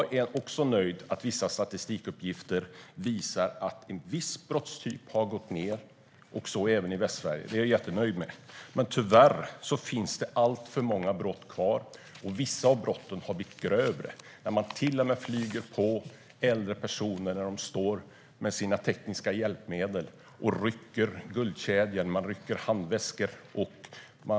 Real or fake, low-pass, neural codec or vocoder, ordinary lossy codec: real; none; none; none